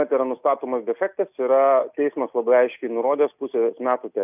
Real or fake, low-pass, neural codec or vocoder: real; 3.6 kHz; none